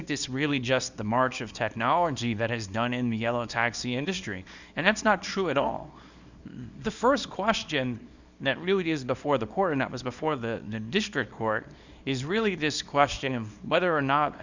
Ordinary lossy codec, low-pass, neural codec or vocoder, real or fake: Opus, 64 kbps; 7.2 kHz; codec, 24 kHz, 0.9 kbps, WavTokenizer, small release; fake